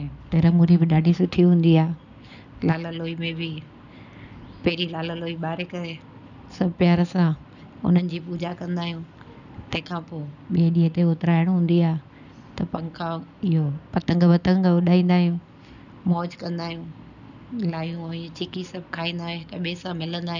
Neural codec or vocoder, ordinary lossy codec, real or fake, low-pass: none; none; real; 7.2 kHz